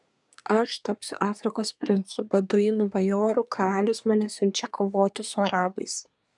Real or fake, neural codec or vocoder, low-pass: fake; codec, 24 kHz, 1 kbps, SNAC; 10.8 kHz